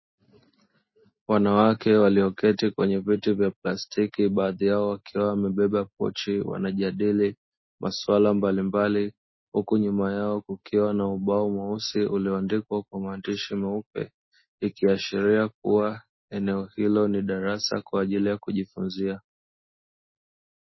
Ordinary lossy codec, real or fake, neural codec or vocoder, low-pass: MP3, 24 kbps; real; none; 7.2 kHz